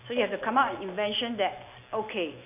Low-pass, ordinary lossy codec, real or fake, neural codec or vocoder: 3.6 kHz; none; real; none